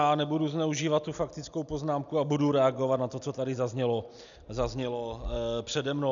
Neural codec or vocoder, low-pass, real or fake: none; 7.2 kHz; real